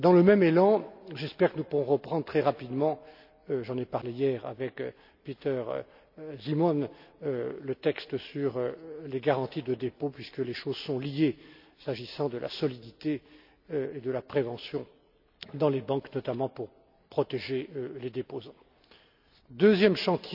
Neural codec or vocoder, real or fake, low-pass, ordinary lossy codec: none; real; 5.4 kHz; none